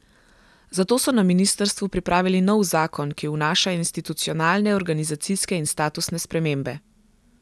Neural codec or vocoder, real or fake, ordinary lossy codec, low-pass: none; real; none; none